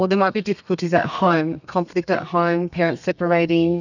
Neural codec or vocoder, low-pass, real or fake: codec, 32 kHz, 1.9 kbps, SNAC; 7.2 kHz; fake